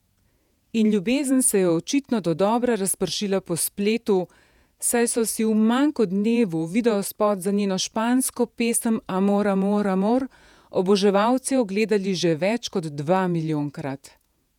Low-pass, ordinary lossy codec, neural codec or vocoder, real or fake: 19.8 kHz; none; vocoder, 48 kHz, 128 mel bands, Vocos; fake